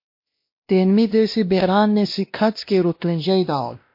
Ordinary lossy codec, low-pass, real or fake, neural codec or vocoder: MP3, 32 kbps; 5.4 kHz; fake; codec, 16 kHz, 1 kbps, X-Codec, WavLM features, trained on Multilingual LibriSpeech